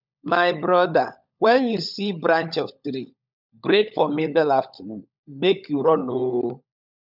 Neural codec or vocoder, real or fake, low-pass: codec, 16 kHz, 16 kbps, FunCodec, trained on LibriTTS, 50 frames a second; fake; 5.4 kHz